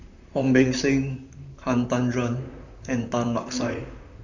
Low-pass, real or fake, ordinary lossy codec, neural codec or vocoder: 7.2 kHz; fake; none; vocoder, 44.1 kHz, 128 mel bands, Pupu-Vocoder